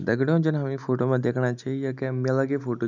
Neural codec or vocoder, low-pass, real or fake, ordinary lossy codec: none; 7.2 kHz; real; none